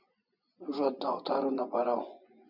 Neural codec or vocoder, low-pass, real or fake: vocoder, 24 kHz, 100 mel bands, Vocos; 5.4 kHz; fake